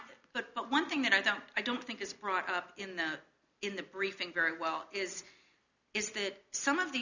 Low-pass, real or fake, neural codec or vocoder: 7.2 kHz; real; none